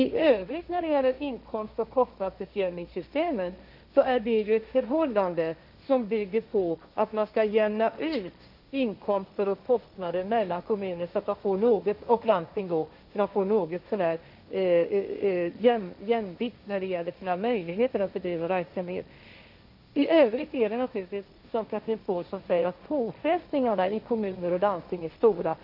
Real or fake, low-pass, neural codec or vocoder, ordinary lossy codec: fake; 5.4 kHz; codec, 16 kHz, 1.1 kbps, Voila-Tokenizer; none